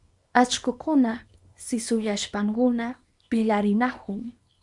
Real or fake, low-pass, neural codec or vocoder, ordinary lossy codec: fake; 10.8 kHz; codec, 24 kHz, 0.9 kbps, WavTokenizer, small release; Opus, 64 kbps